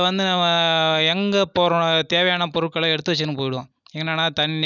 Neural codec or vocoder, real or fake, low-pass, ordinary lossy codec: none; real; 7.2 kHz; none